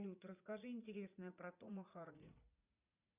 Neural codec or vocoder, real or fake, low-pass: vocoder, 44.1 kHz, 80 mel bands, Vocos; fake; 3.6 kHz